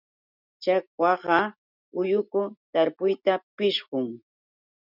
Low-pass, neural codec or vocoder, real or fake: 5.4 kHz; none; real